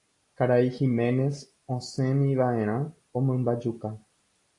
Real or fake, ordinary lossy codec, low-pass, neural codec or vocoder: real; AAC, 48 kbps; 10.8 kHz; none